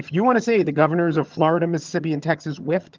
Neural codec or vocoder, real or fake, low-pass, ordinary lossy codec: vocoder, 22.05 kHz, 80 mel bands, HiFi-GAN; fake; 7.2 kHz; Opus, 32 kbps